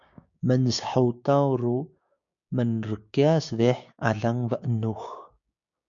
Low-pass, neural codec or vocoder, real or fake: 7.2 kHz; codec, 16 kHz, 6 kbps, DAC; fake